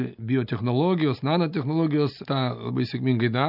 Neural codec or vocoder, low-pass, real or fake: none; 5.4 kHz; real